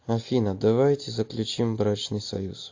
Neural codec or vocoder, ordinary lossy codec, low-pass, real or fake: none; AAC, 48 kbps; 7.2 kHz; real